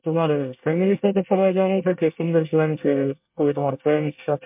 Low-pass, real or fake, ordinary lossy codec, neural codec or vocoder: 3.6 kHz; fake; MP3, 32 kbps; codec, 24 kHz, 1 kbps, SNAC